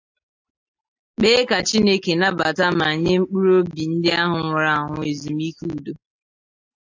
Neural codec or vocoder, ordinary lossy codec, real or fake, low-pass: none; AAC, 48 kbps; real; 7.2 kHz